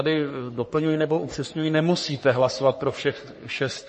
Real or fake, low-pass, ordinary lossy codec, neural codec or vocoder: fake; 10.8 kHz; MP3, 32 kbps; codec, 44.1 kHz, 3.4 kbps, Pupu-Codec